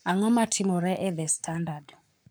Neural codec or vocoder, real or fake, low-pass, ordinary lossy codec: codec, 44.1 kHz, 7.8 kbps, Pupu-Codec; fake; none; none